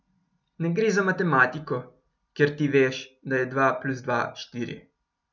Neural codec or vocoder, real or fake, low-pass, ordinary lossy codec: none; real; 7.2 kHz; none